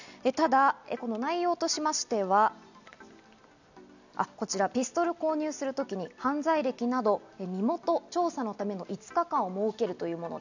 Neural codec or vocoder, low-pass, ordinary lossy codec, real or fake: none; 7.2 kHz; none; real